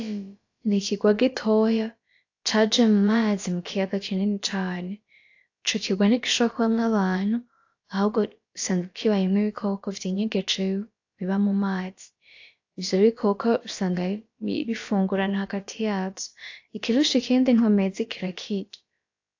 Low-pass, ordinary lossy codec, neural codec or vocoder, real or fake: 7.2 kHz; AAC, 48 kbps; codec, 16 kHz, about 1 kbps, DyCAST, with the encoder's durations; fake